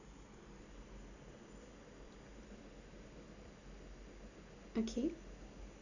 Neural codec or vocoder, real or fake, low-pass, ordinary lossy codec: none; real; 7.2 kHz; none